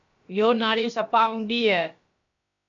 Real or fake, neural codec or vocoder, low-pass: fake; codec, 16 kHz, about 1 kbps, DyCAST, with the encoder's durations; 7.2 kHz